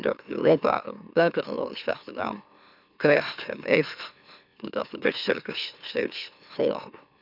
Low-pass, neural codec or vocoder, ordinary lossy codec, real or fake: 5.4 kHz; autoencoder, 44.1 kHz, a latent of 192 numbers a frame, MeloTTS; none; fake